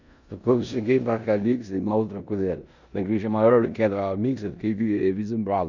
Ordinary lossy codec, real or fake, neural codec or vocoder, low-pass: none; fake; codec, 16 kHz in and 24 kHz out, 0.9 kbps, LongCat-Audio-Codec, four codebook decoder; 7.2 kHz